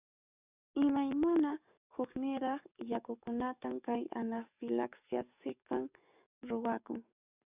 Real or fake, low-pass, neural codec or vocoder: fake; 3.6 kHz; codec, 44.1 kHz, 7.8 kbps, DAC